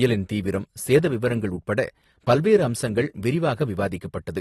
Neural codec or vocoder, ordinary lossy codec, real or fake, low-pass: none; AAC, 32 kbps; real; 19.8 kHz